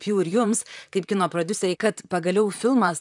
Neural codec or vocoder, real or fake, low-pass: vocoder, 44.1 kHz, 128 mel bands, Pupu-Vocoder; fake; 10.8 kHz